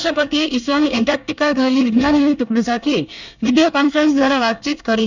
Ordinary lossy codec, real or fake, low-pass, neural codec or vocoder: none; fake; 7.2 kHz; codec, 24 kHz, 1 kbps, SNAC